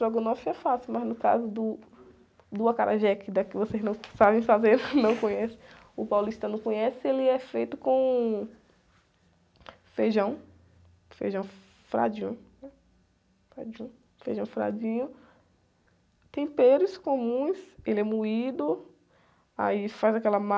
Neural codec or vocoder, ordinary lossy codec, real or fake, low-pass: none; none; real; none